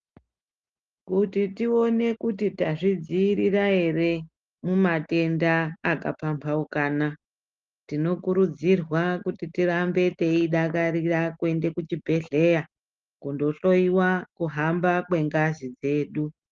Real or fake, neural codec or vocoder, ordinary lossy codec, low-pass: real; none; Opus, 32 kbps; 7.2 kHz